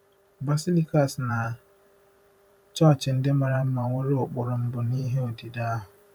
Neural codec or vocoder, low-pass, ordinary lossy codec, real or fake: vocoder, 44.1 kHz, 128 mel bands every 512 samples, BigVGAN v2; 19.8 kHz; none; fake